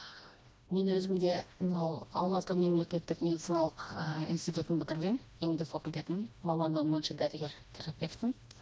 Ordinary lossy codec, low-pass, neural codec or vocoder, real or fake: none; none; codec, 16 kHz, 1 kbps, FreqCodec, smaller model; fake